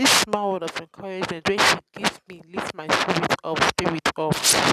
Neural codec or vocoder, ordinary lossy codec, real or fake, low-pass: none; none; real; 14.4 kHz